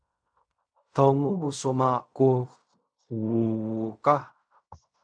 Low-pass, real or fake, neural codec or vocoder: 9.9 kHz; fake; codec, 16 kHz in and 24 kHz out, 0.4 kbps, LongCat-Audio-Codec, fine tuned four codebook decoder